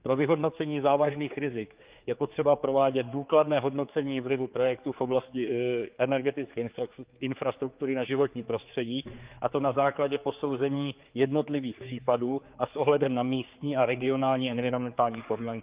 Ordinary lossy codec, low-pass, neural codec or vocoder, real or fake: Opus, 24 kbps; 3.6 kHz; codec, 16 kHz, 4 kbps, X-Codec, HuBERT features, trained on general audio; fake